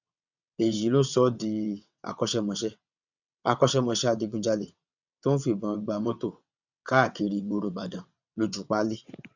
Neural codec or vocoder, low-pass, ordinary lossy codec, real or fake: vocoder, 22.05 kHz, 80 mel bands, WaveNeXt; 7.2 kHz; none; fake